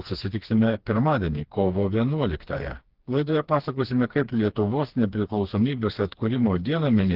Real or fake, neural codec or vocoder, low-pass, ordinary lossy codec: fake; codec, 16 kHz, 2 kbps, FreqCodec, smaller model; 5.4 kHz; Opus, 16 kbps